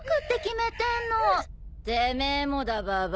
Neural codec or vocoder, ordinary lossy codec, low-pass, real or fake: none; none; none; real